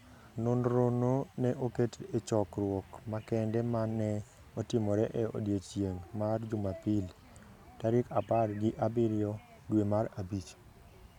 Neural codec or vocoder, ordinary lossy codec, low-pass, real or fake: none; none; 19.8 kHz; real